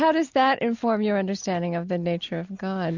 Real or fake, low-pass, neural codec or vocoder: real; 7.2 kHz; none